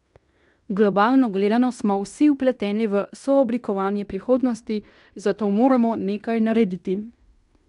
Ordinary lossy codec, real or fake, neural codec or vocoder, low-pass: none; fake; codec, 16 kHz in and 24 kHz out, 0.9 kbps, LongCat-Audio-Codec, fine tuned four codebook decoder; 10.8 kHz